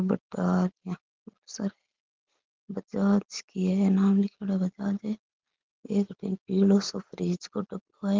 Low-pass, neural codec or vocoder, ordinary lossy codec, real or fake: 7.2 kHz; none; Opus, 16 kbps; real